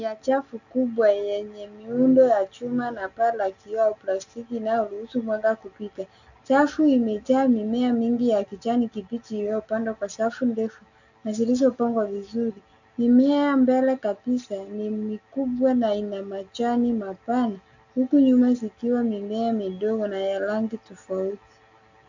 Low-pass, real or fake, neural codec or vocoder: 7.2 kHz; real; none